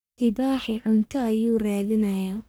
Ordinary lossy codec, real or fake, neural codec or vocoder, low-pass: none; fake; codec, 44.1 kHz, 1.7 kbps, Pupu-Codec; none